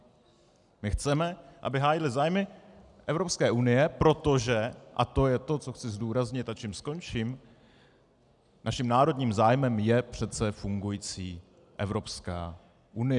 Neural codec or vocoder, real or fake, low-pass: none; real; 10.8 kHz